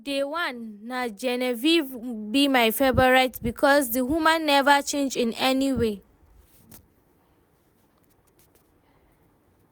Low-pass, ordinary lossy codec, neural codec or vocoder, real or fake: none; none; none; real